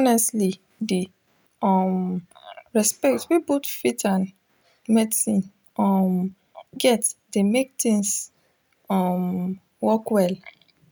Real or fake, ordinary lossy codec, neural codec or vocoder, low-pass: real; none; none; none